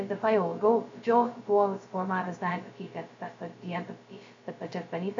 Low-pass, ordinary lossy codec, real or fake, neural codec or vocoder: 7.2 kHz; AAC, 48 kbps; fake; codec, 16 kHz, 0.2 kbps, FocalCodec